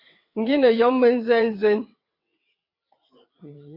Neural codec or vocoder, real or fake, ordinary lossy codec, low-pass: vocoder, 22.05 kHz, 80 mel bands, WaveNeXt; fake; MP3, 32 kbps; 5.4 kHz